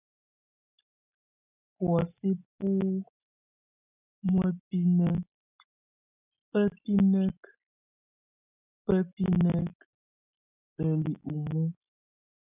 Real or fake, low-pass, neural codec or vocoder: real; 3.6 kHz; none